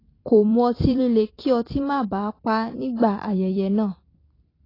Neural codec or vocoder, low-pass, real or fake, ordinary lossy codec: vocoder, 44.1 kHz, 128 mel bands every 256 samples, BigVGAN v2; 5.4 kHz; fake; AAC, 24 kbps